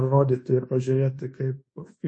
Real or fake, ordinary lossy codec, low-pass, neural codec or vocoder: fake; MP3, 32 kbps; 9.9 kHz; codec, 24 kHz, 1.2 kbps, DualCodec